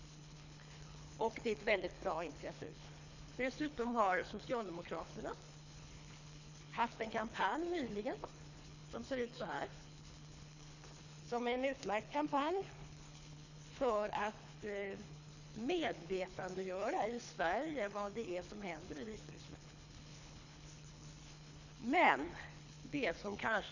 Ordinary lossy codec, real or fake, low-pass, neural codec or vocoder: none; fake; 7.2 kHz; codec, 24 kHz, 3 kbps, HILCodec